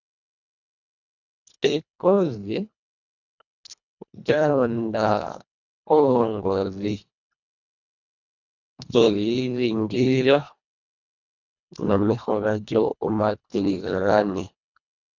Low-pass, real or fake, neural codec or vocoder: 7.2 kHz; fake; codec, 24 kHz, 1.5 kbps, HILCodec